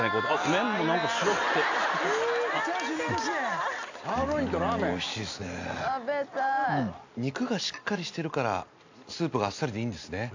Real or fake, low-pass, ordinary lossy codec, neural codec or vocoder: real; 7.2 kHz; AAC, 48 kbps; none